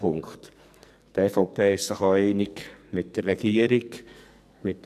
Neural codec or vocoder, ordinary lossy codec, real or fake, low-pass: codec, 44.1 kHz, 2.6 kbps, SNAC; none; fake; 14.4 kHz